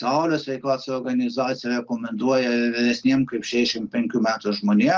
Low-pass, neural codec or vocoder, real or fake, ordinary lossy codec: 7.2 kHz; none; real; Opus, 24 kbps